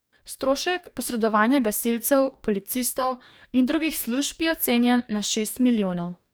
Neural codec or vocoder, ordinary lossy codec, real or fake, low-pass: codec, 44.1 kHz, 2.6 kbps, DAC; none; fake; none